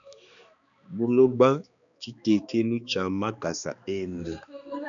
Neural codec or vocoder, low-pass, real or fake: codec, 16 kHz, 2 kbps, X-Codec, HuBERT features, trained on balanced general audio; 7.2 kHz; fake